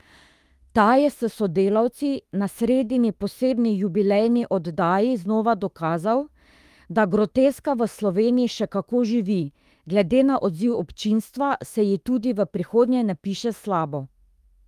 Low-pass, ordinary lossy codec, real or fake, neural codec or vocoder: 14.4 kHz; Opus, 32 kbps; fake; autoencoder, 48 kHz, 32 numbers a frame, DAC-VAE, trained on Japanese speech